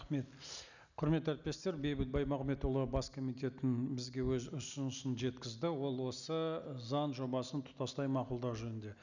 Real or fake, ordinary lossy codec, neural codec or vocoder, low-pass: real; none; none; 7.2 kHz